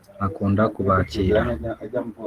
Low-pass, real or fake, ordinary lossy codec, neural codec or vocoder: 14.4 kHz; real; Opus, 16 kbps; none